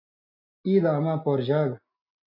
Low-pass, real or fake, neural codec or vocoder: 5.4 kHz; real; none